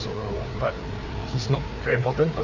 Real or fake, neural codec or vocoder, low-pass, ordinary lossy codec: fake; codec, 16 kHz, 4 kbps, FreqCodec, larger model; 7.2 kHz; none